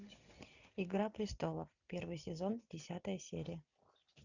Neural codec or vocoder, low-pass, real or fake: none; 7.2 kHz; real